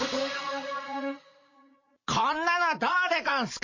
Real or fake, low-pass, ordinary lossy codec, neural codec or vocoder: fake; 7.2 kHz; MP3, 32 kbps; vocoder, 44.1 kHz, 80 mel bands, Vocos